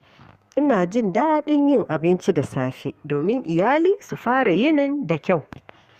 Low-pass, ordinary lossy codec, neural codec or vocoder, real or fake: 14.4 kHz; Opus, 64 kbps; codec, 32 kHz, 1.9 kbps, SNAC; fake